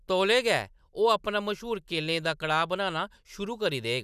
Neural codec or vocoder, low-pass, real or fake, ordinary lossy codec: none; 14.4 kHz; real; none